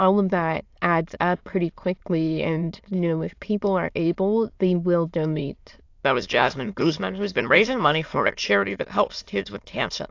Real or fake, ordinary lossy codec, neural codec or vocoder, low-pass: fake; AAC, 48 kbps; autoencoder, 22.05 kHz, a latent of 192 numbers a frame, VITS, trained on many speakers; 7.2 kHz